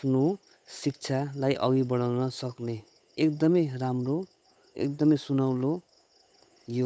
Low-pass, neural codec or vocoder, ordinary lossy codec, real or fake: none; codec, 16 kHz, 8 kbps, FunCodec, trained on Chinese and English, 25 frames a second; none; fake